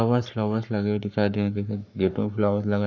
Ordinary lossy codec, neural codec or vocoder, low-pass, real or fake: none; codec, 44.1 kHz, 7.8 kbps, Pupu-Codec; 7.2 kHz; fake